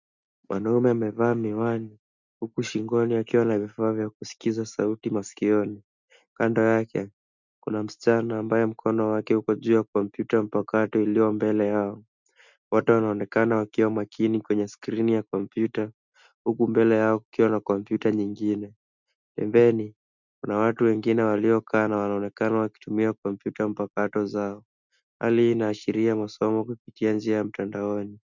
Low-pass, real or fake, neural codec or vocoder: 7.2 kHz; real; none